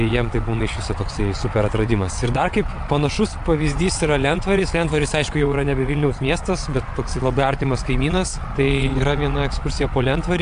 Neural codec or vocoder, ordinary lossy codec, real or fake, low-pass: vocoder, 22.05 kHz, 80 mel bands, WaveNeXt; AAC, 64 kbps; fake; 9.9 kHz